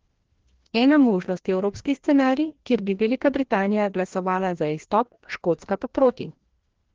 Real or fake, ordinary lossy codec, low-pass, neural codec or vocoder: fake; Opus, 16 kbps; 7.2 kHz; codec, 16 kHz, 1 kbps, FreqCodec, larger model